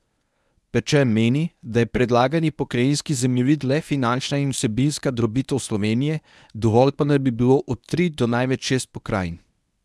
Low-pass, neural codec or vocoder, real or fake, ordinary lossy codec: none; codec, 24 kHz, 0.9 kbps, WavTokenizer, medium speech release version 1; fake; none